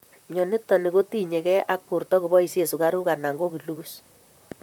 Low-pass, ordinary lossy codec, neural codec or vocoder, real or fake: 19.8 kHz; none; vocoder, 44.1 kHz, 128 mel bands, Pupu-Vocoder; fake